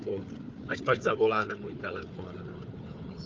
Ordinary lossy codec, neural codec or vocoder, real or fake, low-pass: Opus, 24 kbps; codec, 16 kHz, 4 kbps, FunCodec, trained on Chinese and English, 50 frames a second; fake; 7.2 kHz